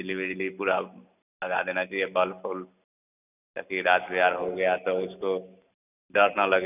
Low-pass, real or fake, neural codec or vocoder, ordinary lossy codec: 3.6 kHz; real; none; none